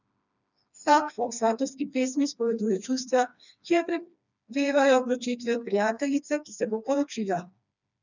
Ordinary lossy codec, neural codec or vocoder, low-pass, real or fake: none; codec, 16 kHz, 2 kbps, FreqCodec, smaller model; 7.2 kHz; fake